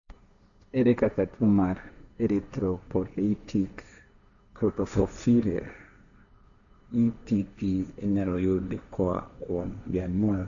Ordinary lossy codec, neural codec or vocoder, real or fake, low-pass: none; codec, 16 kHz, 1.1 kbps, Voila-Tokenizer; fake; 7.2 kHz